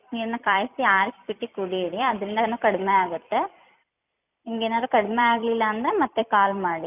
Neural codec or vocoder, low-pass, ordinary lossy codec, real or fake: vocoder, 44.1 kHz, 128 mel bands every 256 samples, BigVGAN v2; 3.6 kHz; none; fake